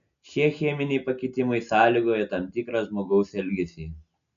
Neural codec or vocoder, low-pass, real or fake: none; 7.2 kHz; real